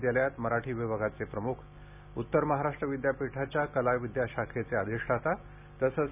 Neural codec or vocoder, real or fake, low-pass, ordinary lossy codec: none; real; 3.6 kHz; none